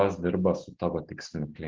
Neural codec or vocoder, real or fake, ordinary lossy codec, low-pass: none; real; Opus, 16 kbps; 7.2 kHz